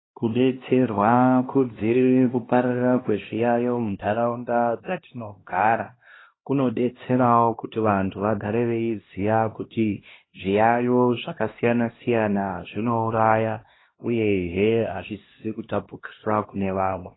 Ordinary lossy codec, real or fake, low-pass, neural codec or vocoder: AAC, 16 kbps; fake; 7.2 kHz; codec, 16 kHz, 1 kbps, X-Codec, HuBERT features, trained on LibriSpeech